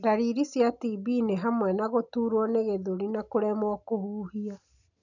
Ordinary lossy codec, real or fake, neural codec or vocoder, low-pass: none; real; none; 7.2 kHz